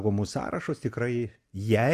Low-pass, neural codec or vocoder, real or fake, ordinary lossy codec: 14.4 kHz; none; real; Opus, 64 kbps